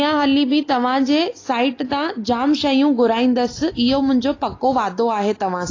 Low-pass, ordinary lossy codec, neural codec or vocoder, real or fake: 7.2 kHz; AAC, 32 kbps; none; real